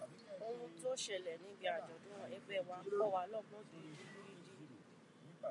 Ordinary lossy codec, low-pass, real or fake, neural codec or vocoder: MP3, 96 kbps; 10.8 kHz; real; none